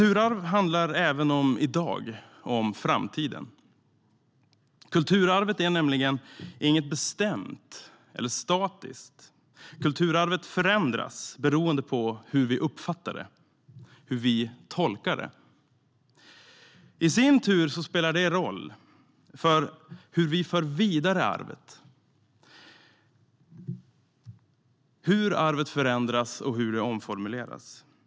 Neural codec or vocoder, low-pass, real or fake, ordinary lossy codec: none; none; real; none